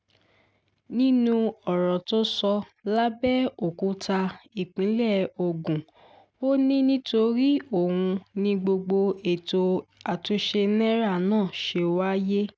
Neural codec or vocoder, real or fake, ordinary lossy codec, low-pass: none; real; none; none